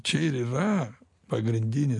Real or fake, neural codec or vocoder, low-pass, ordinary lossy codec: real; none; 10.8 kHz; MP3, 48 kbps